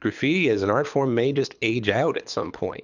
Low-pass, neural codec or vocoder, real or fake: 7.2 kHz; codec, 24 kHz, 6 kbps, HILCodec; fake